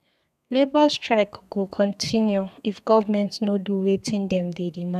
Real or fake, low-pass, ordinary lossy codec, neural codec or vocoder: fake; 14.4 kHz; none; codec, 32 kHz, 1.9 kbps, SNAC